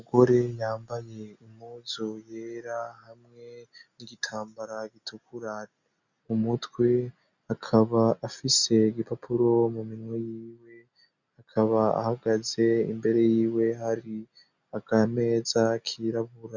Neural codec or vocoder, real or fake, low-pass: none; real; 7.2 kHz